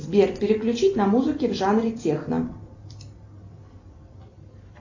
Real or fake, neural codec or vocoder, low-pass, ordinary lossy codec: real; none; 7.2 kHz; AAC, 48 kbps